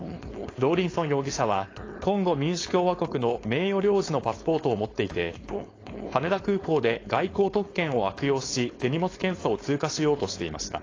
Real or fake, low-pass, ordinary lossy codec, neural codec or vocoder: fake; 7.2 kHz; AAC, 32 kbps; codec, 16 kHz, 4.8 kbps, FACodec